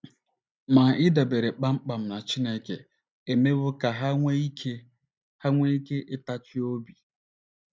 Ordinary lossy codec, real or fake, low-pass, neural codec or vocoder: none; real; none; none